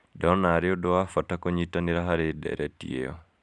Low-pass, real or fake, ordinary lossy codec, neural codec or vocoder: 10.8 kHz; fake; none; vocoder, 44.1 kHz, 128 mel bands every 512 samples, BigVGAN v2